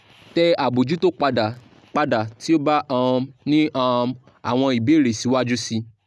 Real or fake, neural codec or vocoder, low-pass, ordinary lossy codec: real; none; none; none